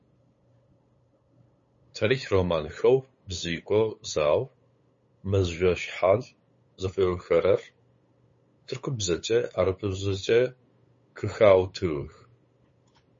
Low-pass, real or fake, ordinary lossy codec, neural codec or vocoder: 7.2 kHz; fake; MP3, 32 kbps; codec, 16 kHz, 8 kbps, FunCodec, trained on LibriTTS, 25 frames a second